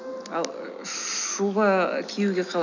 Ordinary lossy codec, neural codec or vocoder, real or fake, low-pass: none; none; real; 7.2 kHz